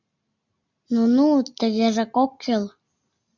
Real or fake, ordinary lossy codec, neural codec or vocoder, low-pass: real; MP3, 64 kbps; none; 7.2 kHz